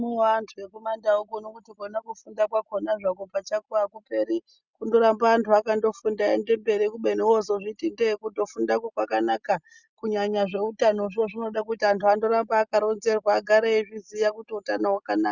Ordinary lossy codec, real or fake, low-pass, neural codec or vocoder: Opus, 64 kbps; real; 7.2 kHz; none